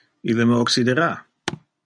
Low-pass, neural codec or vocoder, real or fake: 9.9 kHz; none; real